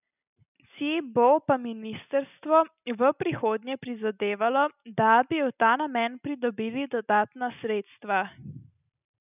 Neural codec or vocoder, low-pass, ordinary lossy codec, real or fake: none; 3.6 kHz; none; real